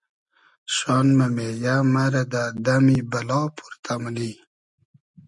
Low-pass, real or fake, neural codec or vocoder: 10.8 kHz; real; none